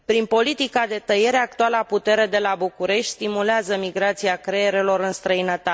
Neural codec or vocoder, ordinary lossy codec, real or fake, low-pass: none; none; real; none